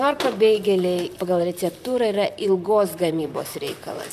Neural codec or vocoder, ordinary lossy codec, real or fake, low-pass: vocoder, 44.1 kHz, 128 mel bands, Pupu-Vocoder; MP3, 96 kbps; fake; 14.4 kHz